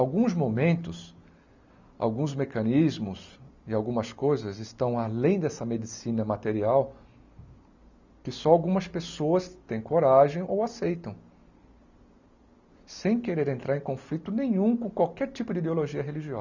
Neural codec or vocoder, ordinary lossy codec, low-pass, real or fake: none; none; 7.2 kHz; real